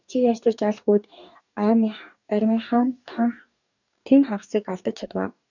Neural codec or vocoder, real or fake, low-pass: codec, 44.1 kHz, 2.6 kbps, DAC; fake; 7.2 kHz